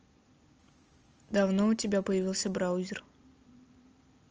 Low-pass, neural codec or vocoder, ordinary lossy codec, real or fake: 7.2 kHz; none; Opus, 24 kbps; real